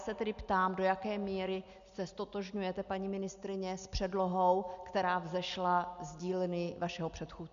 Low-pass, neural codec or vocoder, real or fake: 7.2 kHz; none; real